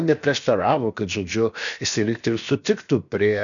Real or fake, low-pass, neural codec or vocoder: fake; 7.2 kHz; codec, 16 kHz, 0.7 kbps, FocalCodec